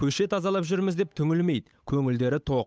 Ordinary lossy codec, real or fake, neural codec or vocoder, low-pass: none; fake; codec, 16 kHz, 8 kbps, FunCodec, trained on Chinese and English, 25 frames a second; none